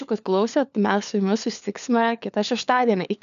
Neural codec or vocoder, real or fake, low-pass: codec, 16 kHz, 4 kbps, FunCodec, trained on LibriTTS, 50 frames a second; fake; 7.2 kHz